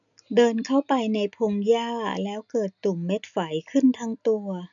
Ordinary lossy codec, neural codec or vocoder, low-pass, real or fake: none; none; 7.2 kHz; real